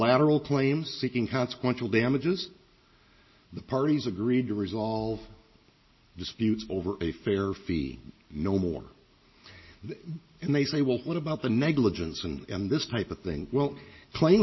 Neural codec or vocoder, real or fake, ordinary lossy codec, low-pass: none; real; MP3, 24 kbps; 7.2 kHz